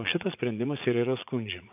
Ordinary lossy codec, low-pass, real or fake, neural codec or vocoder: AAC, 32 kbps; 3.6 kHz; fake; vocoder, 44.1 kHz, 80 mel bands, Vocos